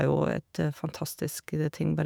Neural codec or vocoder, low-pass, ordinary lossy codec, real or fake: autoencoder, 48 kHz, 128 numbers a frame, DAC-VAE, trained on Japanese speech; none; none; fake